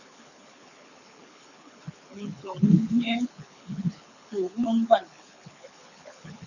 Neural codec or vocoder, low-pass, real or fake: codec, 24 kHz, 6 kbps, HILCodec; 7.2 kHz; fake